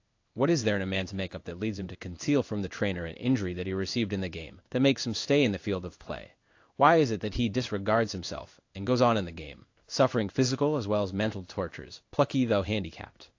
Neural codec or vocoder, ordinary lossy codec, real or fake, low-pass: codec, 16 kHz in and 24 kHz out, 1 kbps, XY-Tokenizer; AAC, 48 kbps; fake; 7.2 kHz